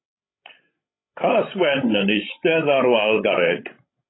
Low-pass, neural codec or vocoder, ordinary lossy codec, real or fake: 7.2 kHz; codec, 16 kHz, 16 kbps, FreqCodec, larger model; AAC, 16 kbps; fake